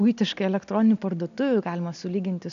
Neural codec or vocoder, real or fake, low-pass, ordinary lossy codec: none; real; 7.2 kHz; AAC, 96 kbps